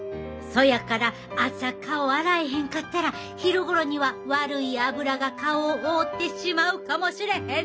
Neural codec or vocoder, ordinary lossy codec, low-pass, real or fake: none; none; none; real